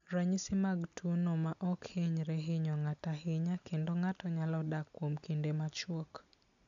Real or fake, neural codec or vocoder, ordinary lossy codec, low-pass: real; none; none; 7.2 kHz